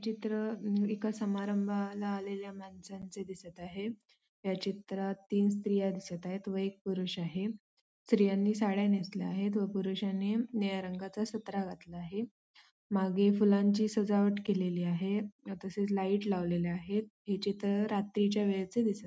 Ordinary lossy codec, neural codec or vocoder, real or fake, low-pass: none; none; real; none